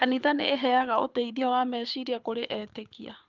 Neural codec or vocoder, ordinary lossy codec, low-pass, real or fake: codec, 24 kHz, 6 kbps, HILCodec; Opus, 32 kbps; 7.2 kHz; fake